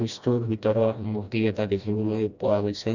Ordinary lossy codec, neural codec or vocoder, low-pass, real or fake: none; codec, 16 kHz, 1 kbps, FreqCodec, smaller model; 7.2 kHz; fake